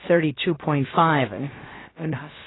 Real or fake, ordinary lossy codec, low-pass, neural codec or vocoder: fake; AAC, 16 kbps; 7.2 kHz; codec, 16 kHz, about 1 kbps, DyCAST, with the encoder's durations